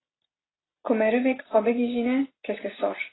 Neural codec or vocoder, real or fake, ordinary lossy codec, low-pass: none; real; AAC, 16 kbps; 7.2 kHz